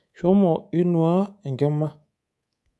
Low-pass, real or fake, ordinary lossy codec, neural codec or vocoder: none; fake; none; codec, 24 kHz, 3.1 kbps, DualCodec